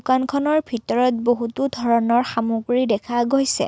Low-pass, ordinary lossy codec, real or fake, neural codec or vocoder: none; none; real; none